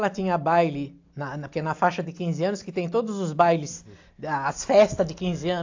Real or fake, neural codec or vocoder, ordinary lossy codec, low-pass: real; none; AAC, 48 kbps; 7.2 kHz